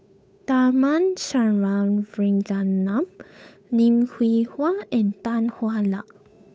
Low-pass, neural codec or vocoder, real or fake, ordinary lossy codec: none; codec, 16 kHz, 8 kbps, FunCodec, trained on Chinese and English, 25 frames a second; fake; none